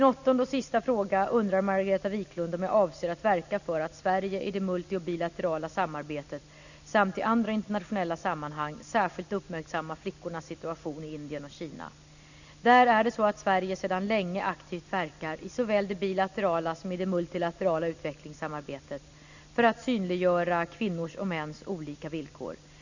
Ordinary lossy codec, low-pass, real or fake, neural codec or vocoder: none; 7.2 kHz; real; none